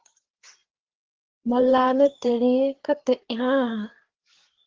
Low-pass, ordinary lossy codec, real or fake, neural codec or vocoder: 7.2 kHz; Opus, 16 kbps; fake; codec, 16 kHz, 4 kbps, FreqCodec, larger model